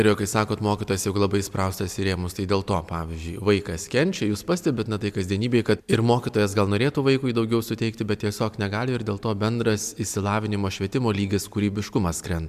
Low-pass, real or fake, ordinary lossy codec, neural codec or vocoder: 14.4 kHz; real; MP3, 96 kbps; none